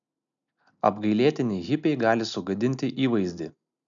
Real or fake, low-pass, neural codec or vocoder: real; 7.2 kHz; none